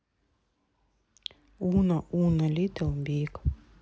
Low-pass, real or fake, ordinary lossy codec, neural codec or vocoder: none; real; none; none